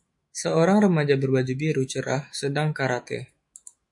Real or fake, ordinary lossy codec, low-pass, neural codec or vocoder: fake; MP3, 48 kbps; 10.8 kHz; autoencoder, 48 kHz, 128 numbers a frame, DAC-VAE, trained on Japanese speech